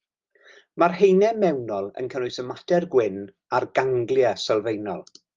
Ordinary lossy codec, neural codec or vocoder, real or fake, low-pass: Opus, 32 kbps; none; real; 7.2 kHz